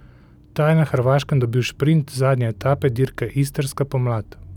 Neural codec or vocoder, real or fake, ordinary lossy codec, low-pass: none; real; none; 19.8 kHz